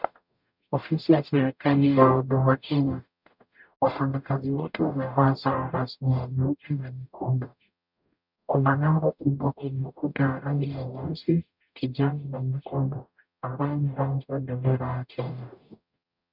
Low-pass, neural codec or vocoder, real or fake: 5.4 kHz; codec, 44.1 kHz, 0.9 kbps, DAC; fake